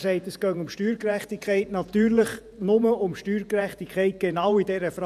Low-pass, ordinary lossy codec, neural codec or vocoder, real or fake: 14.4 kHz; none; vocoder, 48 kHz, 128 mel bands, Vocos; fake